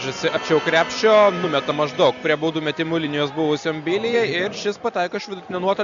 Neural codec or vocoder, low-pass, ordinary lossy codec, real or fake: none; 7.2 kHz; Opus, 64 kbps; real